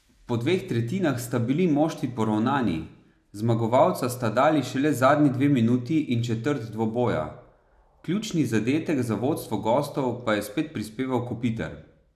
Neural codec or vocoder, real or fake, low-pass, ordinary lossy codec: none; real; 14.4 kHz; none